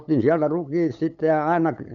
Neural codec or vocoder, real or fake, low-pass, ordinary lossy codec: codec, 16 kHz, 8 kbps, FunCodec, trained on LibriTTS, 25 frames a second; fake; 7.2 kHz; none